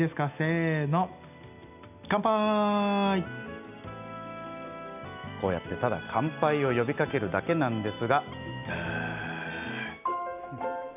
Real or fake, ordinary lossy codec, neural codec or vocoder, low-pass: real; none; none; 3.6 kHz